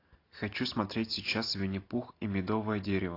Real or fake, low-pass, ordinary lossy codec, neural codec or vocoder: real; 5.4 kHz; AAC, 32 kbps; none